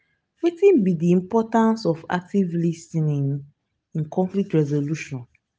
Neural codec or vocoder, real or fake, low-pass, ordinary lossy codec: none; real; none; none